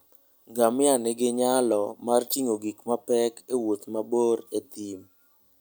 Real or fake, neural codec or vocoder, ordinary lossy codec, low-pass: real; none; none; none